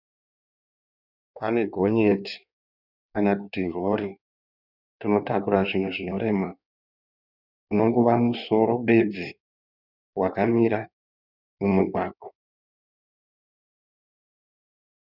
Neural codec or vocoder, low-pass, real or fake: codec, 16 kHz in and 24 kHz out, 1.1 kbps, FireRedTTS-2 codec; 5.4 kHz; fake